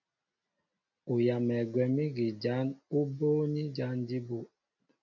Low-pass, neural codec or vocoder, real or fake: 7.2 kHz; none; real